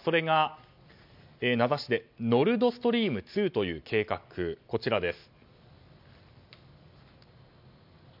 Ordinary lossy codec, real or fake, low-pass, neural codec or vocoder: MP3, 48 kbps; real; 5.4 kHz; none